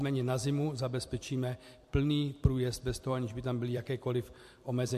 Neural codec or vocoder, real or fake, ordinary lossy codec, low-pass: vocoder, 44.1 kHz, 128 mel bands every 256 samples, BigVGAN v2; fake; MP3, 64 kbps; 14.4 kHz